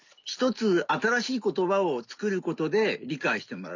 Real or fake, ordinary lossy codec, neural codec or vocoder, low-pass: real; none; none; 7.2 kHz